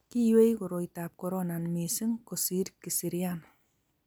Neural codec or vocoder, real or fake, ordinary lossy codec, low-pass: none; real; none; none